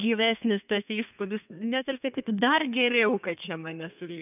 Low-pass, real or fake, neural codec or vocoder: 3.6 kHz; fake; codec, 32 kHz, 1.9 kbps, SNAC